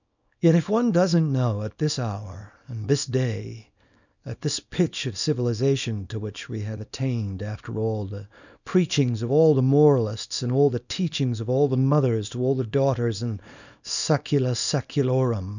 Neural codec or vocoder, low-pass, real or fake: codec, 24 kHz, 0.9 kbps, WavTokenizer, small release; 7.2 kHz; fake